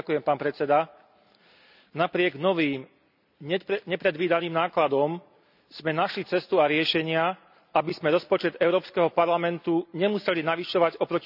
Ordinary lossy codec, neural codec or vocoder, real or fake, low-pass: none; none; real; 5.4 kHz